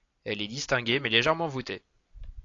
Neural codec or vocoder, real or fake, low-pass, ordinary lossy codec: none; real; 7.2 kHz; AAC, 48 kbps